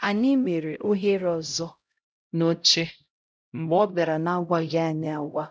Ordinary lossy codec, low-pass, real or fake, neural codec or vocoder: none; none; fake; codec, 16 kHz, 0.5 kbps, X-Codec, HuBERT features, trained on LibriSpeech